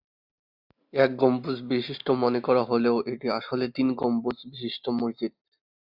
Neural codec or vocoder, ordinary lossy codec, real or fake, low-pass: none; Opus, 64 kbps; real; 5.4 kHz